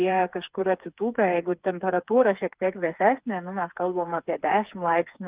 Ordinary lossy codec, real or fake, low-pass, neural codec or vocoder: Opus, 32 kbps; fake; 3.6 kHz; codec, 16 kHz, 4 kbps, FreqCodec, smaller model